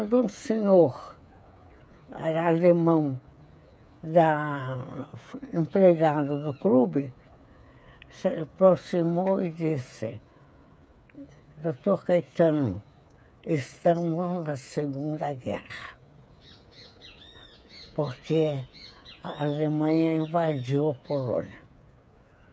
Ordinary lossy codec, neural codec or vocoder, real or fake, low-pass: none; codec, 16 kHz, 8 kbps, FreqCodec, smaller model; fake; none